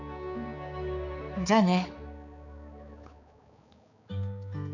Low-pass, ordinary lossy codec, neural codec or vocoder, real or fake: 7.2 kHz; none; codec, 16 kHz, 4 kbps, X-Codec, HuBERT features, trained on balanced general audio; fake